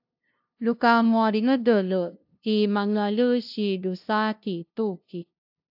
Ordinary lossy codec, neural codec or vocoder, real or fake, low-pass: AAC, 48 kbps; codec, 16 kHz, 0.5 kbps, FunCodec, trained on LibriTTS, 25 frames a second; fake; 5.4 kHz